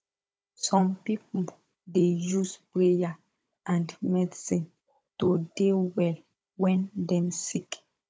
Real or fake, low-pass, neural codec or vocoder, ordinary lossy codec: fake; none; codec, 16 kHz, 16 kbps, FunCodec, trained on Chinese and English, 50 frames a second; none